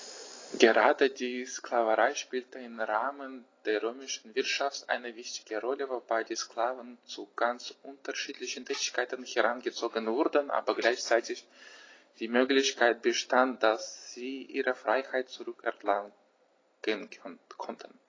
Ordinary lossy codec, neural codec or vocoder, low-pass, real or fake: AAC, 32 kbps; none; 7.2 kHz; real